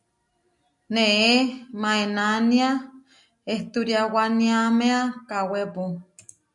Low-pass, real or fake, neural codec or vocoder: 10.8 kHz; real; none